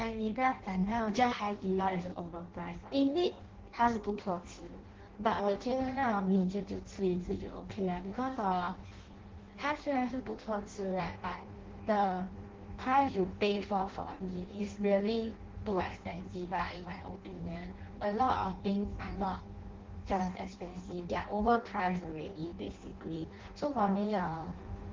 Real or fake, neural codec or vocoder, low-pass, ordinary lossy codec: fake; codec, 16 kHz in and 24 kHz out, 0.6 kbps, FireRedTTS-2 codec; 7.2 kHz; Opus, 16 kbps